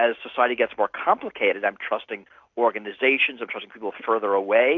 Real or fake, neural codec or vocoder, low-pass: real; none; 7.2 kHz